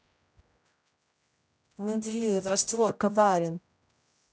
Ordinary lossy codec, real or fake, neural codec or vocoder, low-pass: none; fake; codec, 16 kHz, 0.5 kbps, X-Codec, HuBERT features, trained on general audio; none